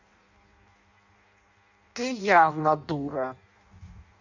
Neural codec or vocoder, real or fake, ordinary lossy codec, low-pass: codec, 16 kHz in and 24 kHz out, 0.6 kbps, FireRedTTS-2 codec; fake; Opus, 64 kbps; 7.2 kHz